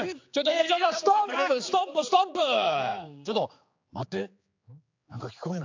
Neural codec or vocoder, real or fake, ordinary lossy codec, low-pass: codec, 16 kHz, 4 kbps, X-Codec, HuBERT features, trained on general audio; fake; AAC, 48 kbps; 7.2 kHz